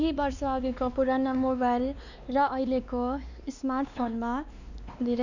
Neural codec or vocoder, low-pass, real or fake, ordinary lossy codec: codec, 16 kHz, 2 kbps, X-Codec, WavLM features, trained on Multilingual LibriSpeech; 7.2 kHz; fake; none